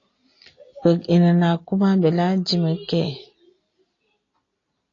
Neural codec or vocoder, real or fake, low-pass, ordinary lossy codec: none; real; 7.2 kHz; AAC, 32 kbps